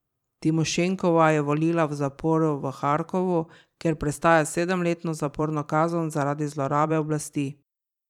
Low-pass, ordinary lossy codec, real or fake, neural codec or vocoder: 19.8 kHz; none; fake; vocoder, 44.1 kHz, 128 mel bands every 512 samples, BigVGAN v2